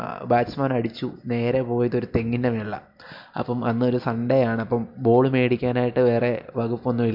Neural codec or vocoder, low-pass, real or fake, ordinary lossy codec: none; 5.4 kHz; real; MP3, 48 kbps